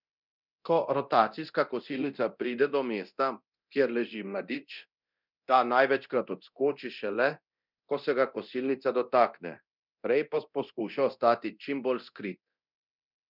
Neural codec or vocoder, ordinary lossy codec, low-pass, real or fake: codec, 24 kHz, 0.9 kbps, DualCodec; none; 5.4 kHz; fake